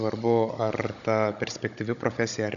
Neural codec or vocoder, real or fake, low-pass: codec, 16 kHz, 16 kbps, FunCodec, trained on Chinese and English, 50 frames a second; fake; 7.2 kHz